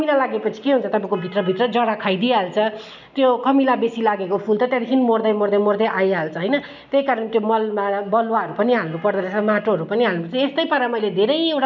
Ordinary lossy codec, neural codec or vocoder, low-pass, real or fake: none; none; 7.2 kHz; real